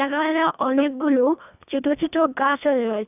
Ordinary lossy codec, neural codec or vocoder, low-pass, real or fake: none; codec, 24 kHz, 1.5 kbps, HILCodec; 3.6 kHz; fake